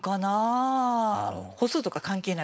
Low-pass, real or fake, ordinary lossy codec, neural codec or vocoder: none; fake; none; codec, 16 kHz, 4.8 kbps, FACodec